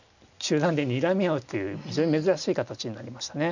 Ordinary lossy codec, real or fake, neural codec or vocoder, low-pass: none; real; none; 7.2 kHz